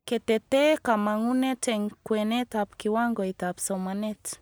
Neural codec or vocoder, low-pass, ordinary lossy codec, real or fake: none; none; none; real